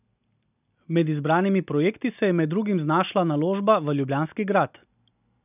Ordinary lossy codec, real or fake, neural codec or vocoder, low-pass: none; real; none; 3.6 kHz